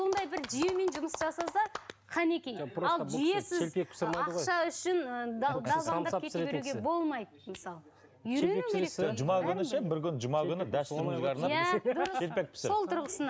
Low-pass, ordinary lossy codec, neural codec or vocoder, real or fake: none; none; none; real